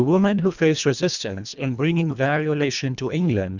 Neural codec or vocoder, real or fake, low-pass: codec, 24 kHz, 1.5 kbps, HILCodec; fake; 7.2 kHz